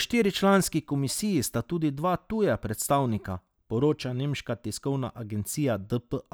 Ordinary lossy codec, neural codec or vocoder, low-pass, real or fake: none; none; none; real